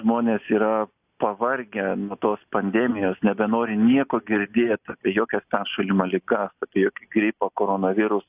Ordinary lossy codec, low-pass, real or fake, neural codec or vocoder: AAC, 32 kbps; 3.6 kHz; real; none